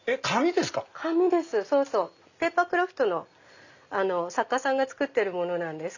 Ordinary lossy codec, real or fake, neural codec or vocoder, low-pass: none; real; none; 7.2 kHz